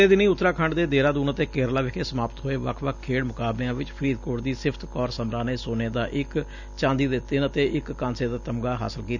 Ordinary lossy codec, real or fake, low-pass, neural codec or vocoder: none; real; 7.2 kHz; none